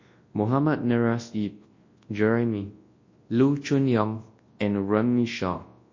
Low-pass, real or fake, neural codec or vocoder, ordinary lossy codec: 7.2 kHz; fake; codec, 24 kHz, 0.9 kbps, WavTokenizer, large speech release; MP3, 32 kbps